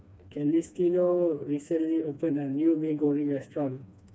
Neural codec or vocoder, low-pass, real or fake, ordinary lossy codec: codec, 16 kHz, 2 kbps, FreqCodec, smaller model; none; fake; none